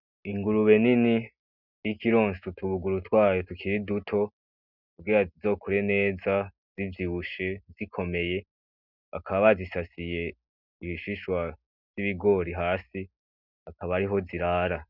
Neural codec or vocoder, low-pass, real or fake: none; 5.4 kHz; real